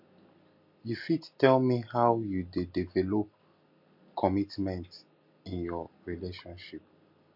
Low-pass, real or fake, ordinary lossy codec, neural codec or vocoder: 5.4 kHz; real; MP3, 48 kbps; none